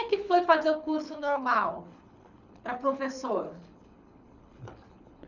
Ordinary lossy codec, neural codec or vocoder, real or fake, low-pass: none; codec, 24 kHz, 6 kbps, HILCodec; fake; 7.2 kHz